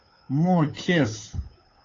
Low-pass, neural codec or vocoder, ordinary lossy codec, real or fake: 7.2 kHz; codec, 16 kHz, 2 kbps, FunCodec, trained on Chinese and English, 25 frames a second; AAC, 32 kbps; fake